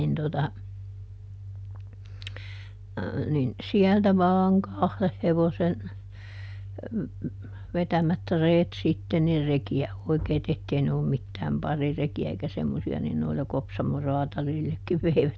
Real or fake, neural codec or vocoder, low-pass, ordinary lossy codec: real; none; none; none